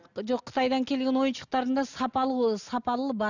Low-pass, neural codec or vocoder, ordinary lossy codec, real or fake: 7.2 kHz; none; none; real